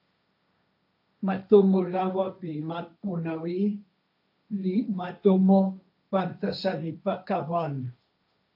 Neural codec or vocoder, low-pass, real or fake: codec, 16 kHz, 1.1 kbps, Voila-Tokenizer; 5.4 kHz; fake